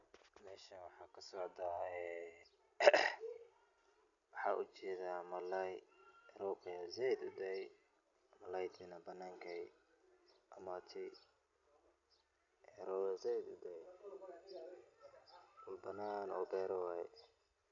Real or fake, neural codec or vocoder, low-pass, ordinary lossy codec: real; none; 7.2 kHz; none